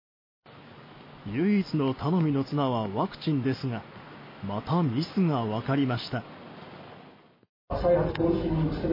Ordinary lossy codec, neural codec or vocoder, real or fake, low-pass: MP3, 24 kbps; none; real; 5.4 kHz